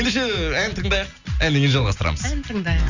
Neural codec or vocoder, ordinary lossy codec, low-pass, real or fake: none; Opus, 64 kbps; 7.2 kHz; real